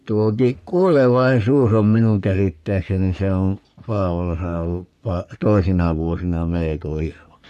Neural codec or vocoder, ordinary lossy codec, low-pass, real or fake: codec, 44.1 kHz, 3.4 kbps, Pupu-Codec; none; 14.4 kHz; fake